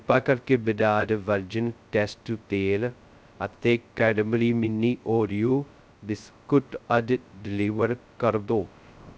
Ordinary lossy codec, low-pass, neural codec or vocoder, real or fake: none; none; codec, 16 kHz, 0.2 kbps, FocalCodec; fake